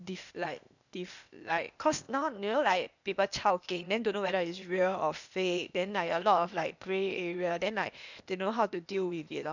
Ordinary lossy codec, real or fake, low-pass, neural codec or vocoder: none; fake; 7.2 kHz; codec, 16 kHz, 0.8 kbps, ZipCodec